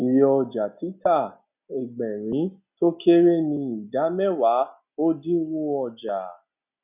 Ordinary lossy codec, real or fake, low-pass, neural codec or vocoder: none; real; 3.6 kHz; none